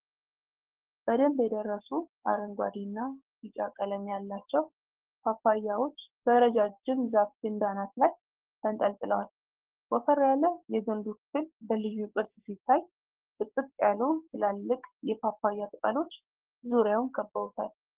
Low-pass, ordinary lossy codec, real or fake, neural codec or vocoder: 3.6 kHz; Opus, 16 kbps; fake; codec, 16 kHz, 6 kbps, DAC